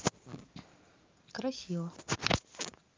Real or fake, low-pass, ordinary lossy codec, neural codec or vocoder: real; 7.2 kHz; Opus, 32 kbps; none